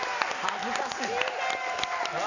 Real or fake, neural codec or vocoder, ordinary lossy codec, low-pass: real; none; none; 7.2 kHz